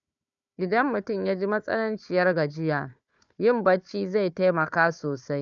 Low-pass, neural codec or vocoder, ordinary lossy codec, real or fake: 7.2 kHz; codec, 16 kHz, 4 kbps, FunCodec, trained on Chinese and English, 50 frames a second; none; fake